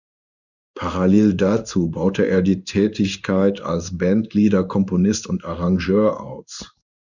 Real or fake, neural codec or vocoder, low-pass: fake; codec, 16 kHz in and 24 kHz out, 1 kbps, XY-Tokenizer; 7.2 kHz